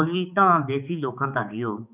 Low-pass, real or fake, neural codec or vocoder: 3.6 kHz; fake; codec, 16 kHz, 4 kbps, X-Codec, HuBERT features, trained on balanced general audio